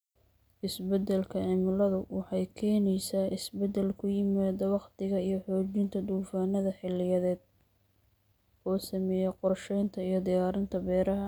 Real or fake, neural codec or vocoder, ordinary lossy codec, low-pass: real; none; none; none